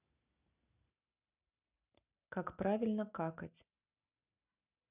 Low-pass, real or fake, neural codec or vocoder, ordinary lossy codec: 3.6 kHz; real; none; none